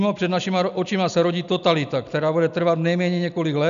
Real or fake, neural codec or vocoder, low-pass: real; none; 7.2 kHz